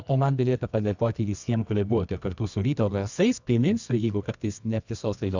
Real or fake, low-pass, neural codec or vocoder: fake; 7.2 kHz; codec, 24 kHz, 0.9 kbps, WavTokenizer, medium music audio release